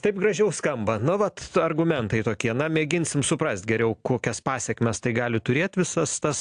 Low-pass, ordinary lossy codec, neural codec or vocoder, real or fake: 9.9 kHz; AAC, 64 kbps; none; real